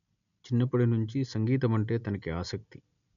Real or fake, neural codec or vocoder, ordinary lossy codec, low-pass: real; none; none; 7.2 kHz